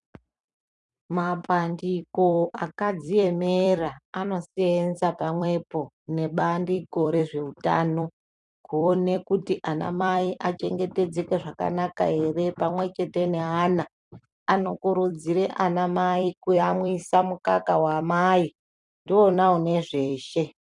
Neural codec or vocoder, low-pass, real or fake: vocoder, 44.1 kHz, 128 mel bands every 256 samples, BigVGAN v2; 10.8 kHz; fake